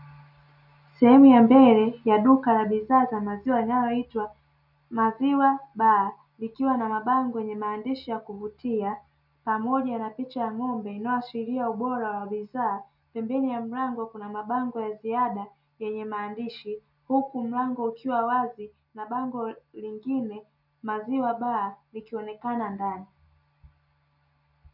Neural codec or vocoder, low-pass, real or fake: none; 5.4 kHz; real